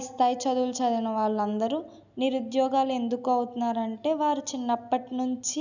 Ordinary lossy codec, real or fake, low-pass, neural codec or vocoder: none; real; 7.2 kHz; none